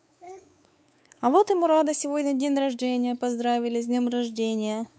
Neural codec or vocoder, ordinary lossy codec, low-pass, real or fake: codec, 16 kHz, 4 kbps, X-Codec, WavLM features, trained on Multilingual LibriSpeech; none; none; fake